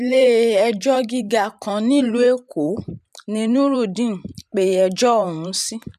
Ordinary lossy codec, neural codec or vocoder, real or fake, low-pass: none; vocoder, 44.1 kHz, 128 mel bands every 512 samples, BigVGAN v2; fake; 14.4 kHz